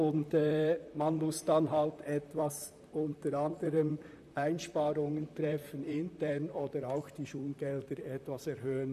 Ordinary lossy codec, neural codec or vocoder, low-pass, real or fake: none; vocoder, 44.1 kHz, 128 mel bands, Pupu-Vocoder; 14.4 kHz; fake